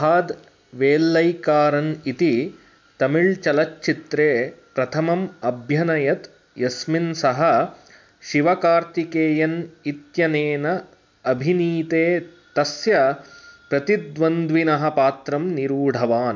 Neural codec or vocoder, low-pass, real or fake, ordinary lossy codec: none; 7.2 kHz; real; MP3, 64 kbps